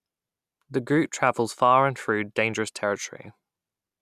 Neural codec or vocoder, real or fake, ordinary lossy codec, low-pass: none; real; none; 14.4 kHz